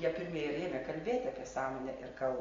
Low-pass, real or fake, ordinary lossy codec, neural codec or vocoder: 7.2 kHz; real; AAC, 32 kbps; none